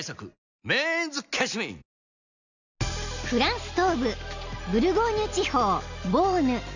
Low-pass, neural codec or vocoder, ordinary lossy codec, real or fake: 7.2 kHz; none; none; real